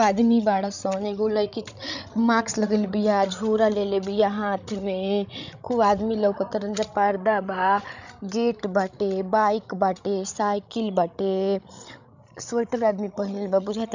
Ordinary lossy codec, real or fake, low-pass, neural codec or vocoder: none; fake; 7.2 kHz; codec, 16 kHz, 16 kbps, FreqCodec, larger model